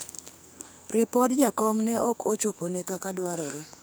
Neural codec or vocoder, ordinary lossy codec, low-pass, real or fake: codec, 44.1 kHz, 2.6 kbps, SNAC; none; none; fake